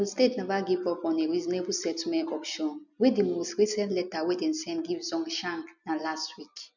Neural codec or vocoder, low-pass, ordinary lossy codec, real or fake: none; 7.2 kHz; none; real